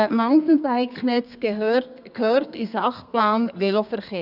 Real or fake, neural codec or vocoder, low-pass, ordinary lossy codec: fake; codec, 32 kHz, 1.9 kbps, SNAC; 5.4 kHz; none